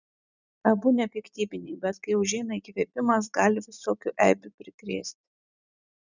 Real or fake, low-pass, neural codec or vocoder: real; 7.2 kHz; none